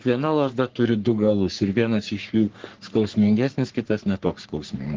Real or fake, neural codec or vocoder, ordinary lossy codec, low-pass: fake; codec, 44.1 kHz, 3.4 kbps, Pupu-Codec; Opus, 16 kbps; 7.2 kHz